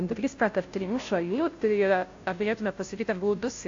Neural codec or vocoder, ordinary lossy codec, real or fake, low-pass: codec, 16 kHz, 0.5 kbps, FunCodec, trained on Chinese and English, 25 frames a second; AAC, 48 kbps; fake; 7.2 kHz